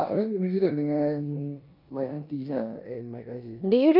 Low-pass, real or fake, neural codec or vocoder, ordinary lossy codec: 5.4 kHz; fake; codec, 16 kHz in and 24 kHz out, 0.9 kbps, LongCat-Audio-Codec, four codebook decoder; none